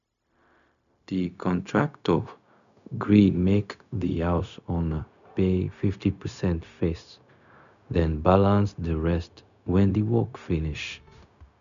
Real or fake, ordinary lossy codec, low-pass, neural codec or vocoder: fake; none; 7.2 kHz; codec, 16 kHz, 0.4 kbps, LongCat-Audio-Codec